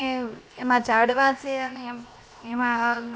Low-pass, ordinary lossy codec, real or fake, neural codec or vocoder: none; none; fake; codec, 16 kHz, 0.7 kbps, FocalCodec